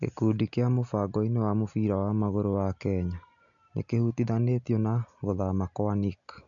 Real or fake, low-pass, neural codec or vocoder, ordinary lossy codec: real; 7.2 kHz; none; none